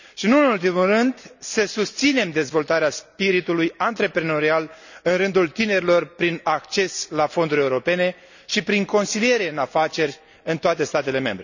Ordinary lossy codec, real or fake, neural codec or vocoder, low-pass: none; real; none; 7.2 kHz